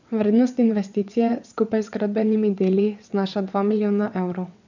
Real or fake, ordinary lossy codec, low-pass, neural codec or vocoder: fake; none; 7.2 kHz; vocoder, 22.05 kHz, 80 mel bands, WaveNeXt